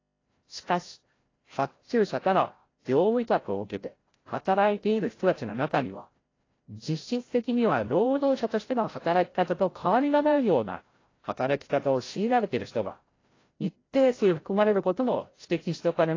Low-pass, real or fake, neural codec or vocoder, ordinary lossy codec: 7.2 kHz; fake; codec, 16 kHz, 0.5 kbps, FreqCodec, larger model; AAC, 32 kbps